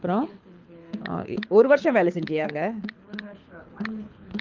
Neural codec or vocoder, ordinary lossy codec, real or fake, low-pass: codec, 24 kHz, 6 kbps, HILCodec; Opus, 24 kbps; fake; 7.2 kHz